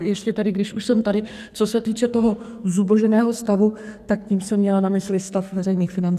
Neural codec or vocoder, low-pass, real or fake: codec, 44.1 kHz, 2.6 kbps, SNAC; 14.4 kHz; fake